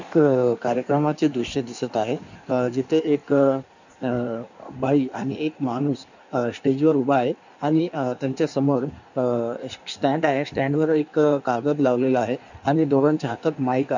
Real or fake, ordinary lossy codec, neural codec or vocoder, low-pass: fake; none; codec, 16 kHz in and 24 kHz out, 1.1 kbps, FireRedTTS-2 codec; 7.2 kHz